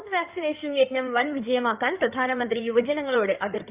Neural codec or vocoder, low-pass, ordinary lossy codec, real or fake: codec, 16 kHz in and 24 kHz out, 2.2 kbps, FireRedTTS-2 codec; 3.6 kHz; Opus, 24 kbps; fake